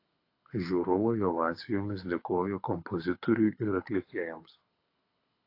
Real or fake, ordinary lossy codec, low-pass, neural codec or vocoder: fake; AAC, 32 kbps; 5.4 kHz; codec, 24 kHz, 6 kbps, HILCodec